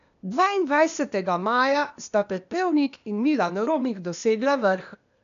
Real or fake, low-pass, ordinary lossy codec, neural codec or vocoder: fake; 7.2 kHz; none; codec, 16 kHz, 0.8 kbps, ZipCodec